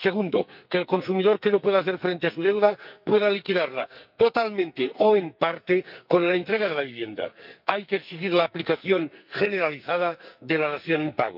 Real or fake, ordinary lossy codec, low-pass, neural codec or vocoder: fake; none; 5.4 kHz; codec, 44.1 kHz, 2.6 kbps, SNAC